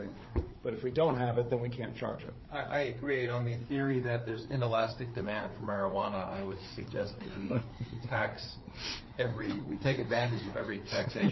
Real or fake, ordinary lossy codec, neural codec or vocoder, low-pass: fake; MP3, 24 kbps; codec, 16 kHz, 4 kbps, FreqCodec, larger model; 7.2 kHz